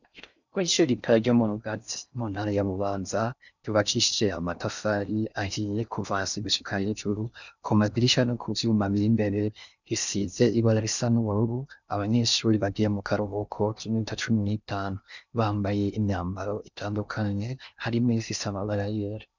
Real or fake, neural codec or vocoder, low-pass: fake; codec, 16 kHz in and 24 kHz out, 0.6 kbps, FocalCodec, streaming, 4096 codes; 7.2 kHz